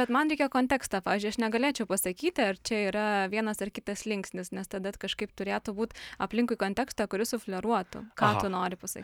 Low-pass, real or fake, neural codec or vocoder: 19.8 kHz; real; none